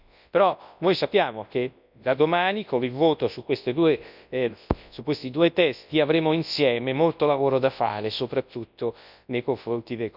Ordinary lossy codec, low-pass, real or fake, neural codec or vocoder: none; 5.4 kHz; fake; codec, 24 kHz, 0.9 kbps, WavTokenizer, large speech release